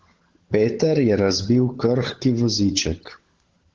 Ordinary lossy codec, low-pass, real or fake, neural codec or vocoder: Opus, 16 kbps; 7.2 kHz; fake; codec, 16 kHz, 16 kbps, FunCodec, trained on LibriTTS, 50 frames a second